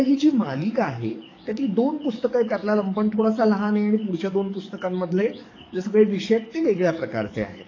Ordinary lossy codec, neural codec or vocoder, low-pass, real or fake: AAC, 32 kbps; codec, 44.1 kHz, 7.8 kbps, DAC; 7.2 kHz; fake